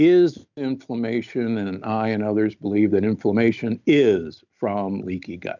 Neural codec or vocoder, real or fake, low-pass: none; real; 7.2 kHz